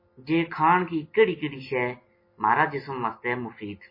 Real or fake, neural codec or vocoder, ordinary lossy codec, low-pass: real; none; MP3, 24 kbps; 5.4 kHz